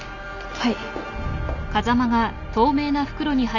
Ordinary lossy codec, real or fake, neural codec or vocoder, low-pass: none; real; none; 7.2 kHz